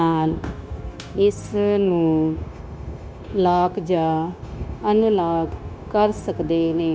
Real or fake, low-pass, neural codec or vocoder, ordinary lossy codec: fake; none; codec, 16 kHz, 0.9 kbps, LongCat-Audio-Codec; none